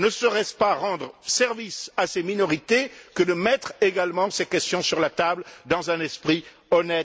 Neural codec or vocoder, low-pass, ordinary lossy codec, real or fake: none; none; none; real